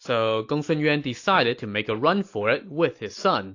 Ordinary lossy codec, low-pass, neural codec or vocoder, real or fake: AAC, 48 kbps; 7.2 kHz; none; real